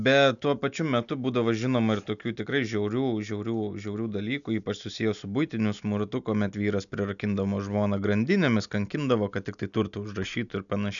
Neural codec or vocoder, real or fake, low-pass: none; real; 7.2 kHz